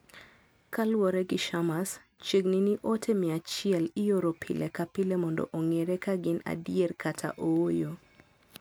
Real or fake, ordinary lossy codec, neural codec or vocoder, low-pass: real; none; none; none